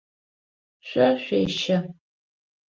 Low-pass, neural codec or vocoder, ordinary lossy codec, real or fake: 7.2 kHz; none; Opus, 24 kbps; real